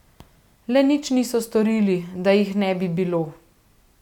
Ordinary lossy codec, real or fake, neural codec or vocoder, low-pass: none; real; none; 19.8 kHz